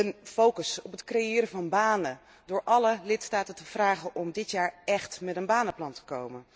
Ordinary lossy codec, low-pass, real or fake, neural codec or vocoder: none; none; real; none